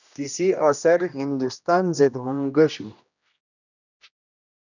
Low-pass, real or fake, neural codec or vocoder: 7.2 kHz; fake; codec, 16 kHz, 1 kbps, X-Codec, HuBERT features, trained on general audio